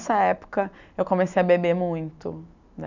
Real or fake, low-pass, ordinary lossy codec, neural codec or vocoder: real; 7.2 kHz; none; none